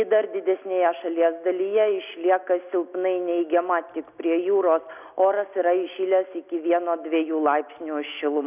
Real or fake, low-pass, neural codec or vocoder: real; 3.6 kHz; none